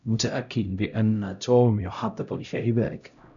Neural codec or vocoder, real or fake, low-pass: codec, 16 kHz, 0.5 kbps, X-Codec, HuBERT features, trained on LibriSpeech; fake; 7.2 kHz